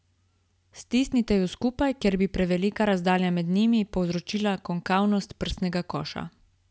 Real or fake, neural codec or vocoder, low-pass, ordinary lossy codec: real; none; none; none